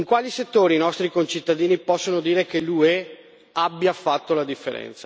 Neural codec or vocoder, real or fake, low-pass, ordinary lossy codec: none; real; none; none